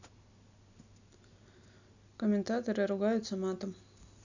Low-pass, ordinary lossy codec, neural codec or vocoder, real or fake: 7.2 kHz; none; none; real